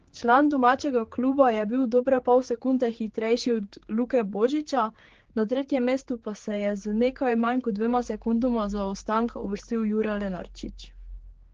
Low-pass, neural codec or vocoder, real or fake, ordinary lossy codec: 7.2 kHz; codec, 16 kHz, 4 kbps, X-Codec, HuBERT features, trained on general audio; fake; Opus, 16 kbps